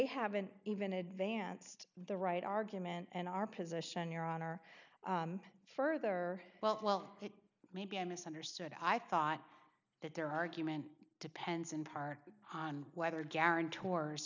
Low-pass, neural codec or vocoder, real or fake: 7.2 kHz; none; real